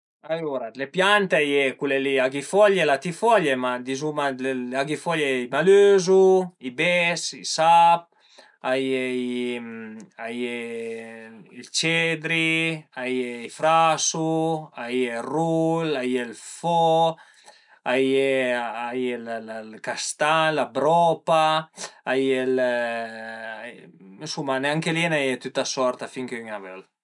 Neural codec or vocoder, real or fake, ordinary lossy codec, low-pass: none; real; none; 10.8 kHz